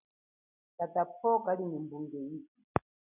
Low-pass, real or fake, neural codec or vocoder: 3.6 kHz; real; none